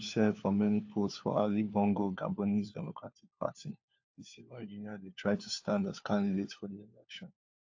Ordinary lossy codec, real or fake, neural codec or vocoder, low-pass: AAC, 48 kbps; fake; codec, 16 kHz, 4 kbps, FunCodec, trained on LibriTTS, 50 frames a second; 7.2 kHz